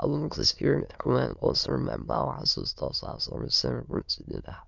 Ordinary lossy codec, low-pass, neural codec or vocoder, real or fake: none; 7.2 kHz; autoencoder, 22.05 kHz, a latent of 192 numbers a frame, VITS, trained on many speakers; fake